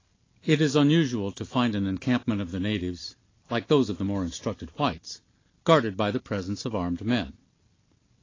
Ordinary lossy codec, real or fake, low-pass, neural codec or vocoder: AAC, 32 kbps; real; 7.2 kHz; none